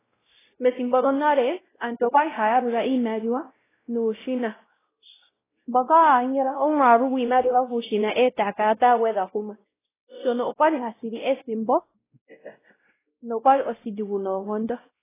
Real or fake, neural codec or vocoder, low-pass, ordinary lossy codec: fake; codec, 16 kHz, 0.5 kbps, X-Codec, WavLM features, trained on Multilingual LibriSpeech; 3.6 kHz; AAC, 16 kbps